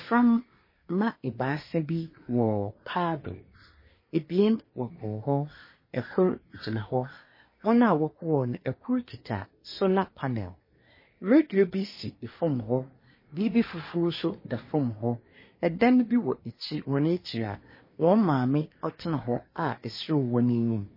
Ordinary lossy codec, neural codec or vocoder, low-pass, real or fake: MP3, 24 kbps; codec, 24 kHz, 1 kbps, SNAC; 5.4 kHz; fake